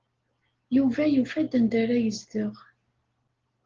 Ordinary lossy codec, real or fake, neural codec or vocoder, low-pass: Opus, 16 kbps; real; none; 7.2 kHz